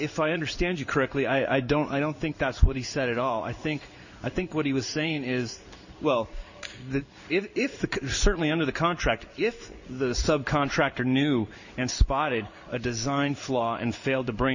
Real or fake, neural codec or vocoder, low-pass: fake; codec, 16 kHz in and 24 kHz out, 1 kbps, XY-Tokenizer; 7.2 kHz